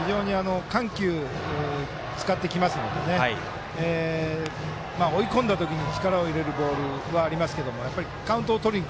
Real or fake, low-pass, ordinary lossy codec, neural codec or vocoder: real; none; none; none